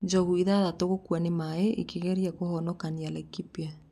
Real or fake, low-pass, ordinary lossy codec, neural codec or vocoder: real; 14.4 kHz; none; none